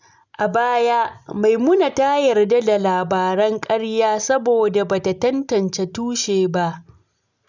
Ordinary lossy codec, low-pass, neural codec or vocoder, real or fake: none; 7.2 kHz; none; real